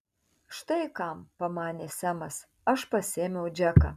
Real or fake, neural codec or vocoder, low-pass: real; none; 14.4 kHz